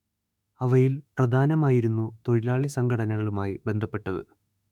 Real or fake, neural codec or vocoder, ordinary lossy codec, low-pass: fake; autoencoder, 48 kHz, 32 numbers a frame, DAC-VAE, trained on Japanese speech; none; 19.8 kHz